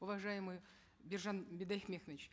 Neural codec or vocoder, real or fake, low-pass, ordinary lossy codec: none; real; none; none